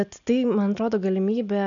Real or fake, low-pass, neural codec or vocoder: real; 7.2 kHz; none